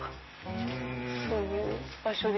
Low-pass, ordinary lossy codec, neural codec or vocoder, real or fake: 7.2 kHz; MP3, 24 kbps; none; real